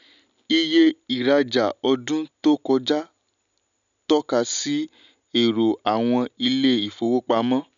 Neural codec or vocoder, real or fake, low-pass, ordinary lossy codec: none; real; 7.2 kHz; none